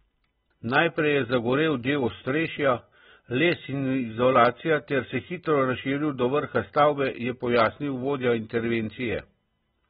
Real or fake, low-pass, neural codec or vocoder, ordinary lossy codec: real; 7.2 kHz; none; AAC, 16 kbps